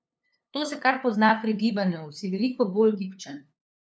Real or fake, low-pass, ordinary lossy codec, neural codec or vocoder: fake; none; none; codec, 16 kHz, 2 kbps, FunCodec, trained on LibriTTS, 25 frames a second